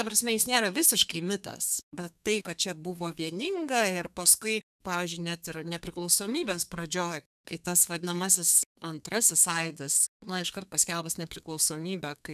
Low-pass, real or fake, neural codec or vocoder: 14.4 kHz; fake; codec, 32 kHz, 1.9 kbps, SNAC